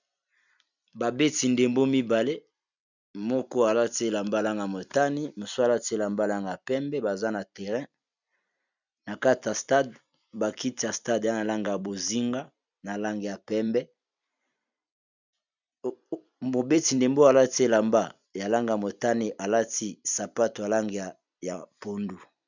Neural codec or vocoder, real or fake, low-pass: vocoder, 44.1 kHz, 128 mel bands every 256 samples, BigVGAN v2; fake; 7.2 kHz